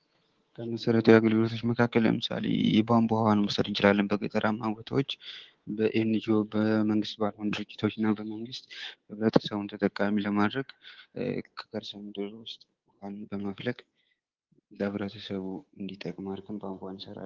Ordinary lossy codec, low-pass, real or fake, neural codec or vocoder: Opus, 16 kbps; 7.2 kHz; fake; codec, 24 kHz, 3.1 kbps, DualCodec